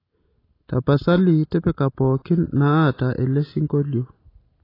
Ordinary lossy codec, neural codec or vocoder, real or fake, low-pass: AAC, 24 kbps; none; real; 5.4 kHz